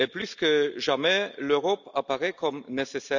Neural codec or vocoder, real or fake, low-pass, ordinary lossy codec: none; real; 7.2 kHz; none